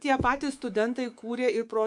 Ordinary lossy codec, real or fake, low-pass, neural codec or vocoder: MP3, 64 kbps; fake; 10.8 kHz; codec, 24 kHz, 3.1 kbps, DualCodec